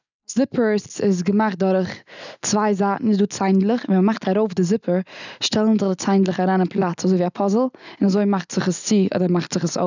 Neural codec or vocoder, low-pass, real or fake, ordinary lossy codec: none; 7.2 kHz; real; none